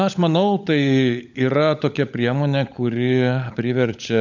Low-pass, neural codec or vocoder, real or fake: 7.2 kHz; codec, 16 kHz, 16 kbps, FunCodec, trained on LibriTTS, 50 frames a second; fake